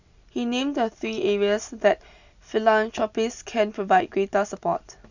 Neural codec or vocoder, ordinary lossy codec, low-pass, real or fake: none; none; 7.2 kHz; real